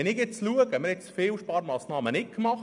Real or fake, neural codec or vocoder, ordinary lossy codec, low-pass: real; none; none; 10.8 kHz